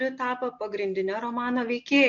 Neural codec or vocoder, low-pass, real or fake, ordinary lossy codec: none; 7.2 kHz; real; MP3, 48 kbps